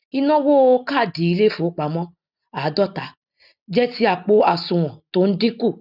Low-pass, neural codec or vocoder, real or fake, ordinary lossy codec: 5.4 kHz; none; real; none